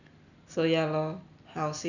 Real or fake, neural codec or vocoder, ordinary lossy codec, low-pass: real; none; none; 7.2 kHz